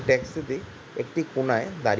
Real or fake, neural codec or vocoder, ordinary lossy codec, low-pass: real; none; none; none